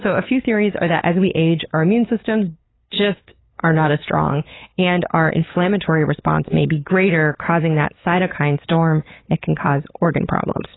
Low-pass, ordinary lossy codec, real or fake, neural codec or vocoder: 7.2 kHz; AAC, 16 kbps; fake; codec, 16 kHz, 8 kbps, FunCodec, trained on LibriTTS, 25 frames a second